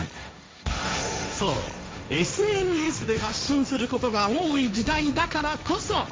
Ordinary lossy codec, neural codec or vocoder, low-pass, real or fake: none; codec, 16 kHz, 1.1 kbps, Voila-Tokenizer; none; fake